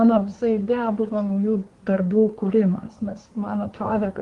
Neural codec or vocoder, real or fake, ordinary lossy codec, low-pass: codec, 24 kHz, 1 kbps, SNAC; fake; Opus, 24 kbps; 10.8 kHz